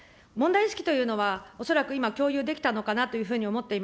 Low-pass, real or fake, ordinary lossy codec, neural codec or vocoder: none; real; none; none